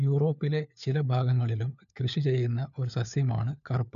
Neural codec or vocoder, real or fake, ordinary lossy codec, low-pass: codec, 16 kHz, 4 kbps, FunCodec, trained on LibriTTS, 50 frames a second; fake; none; 7.2 kHz